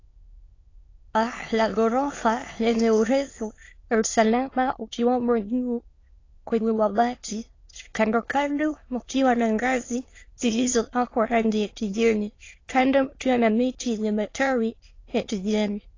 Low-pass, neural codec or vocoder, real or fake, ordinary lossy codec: 7.2 kHz; autoencoder, 22.05 kHz, a latent of 192 numbers a frame, VITS, trained on many speakers; fake; AAC, 32 kbps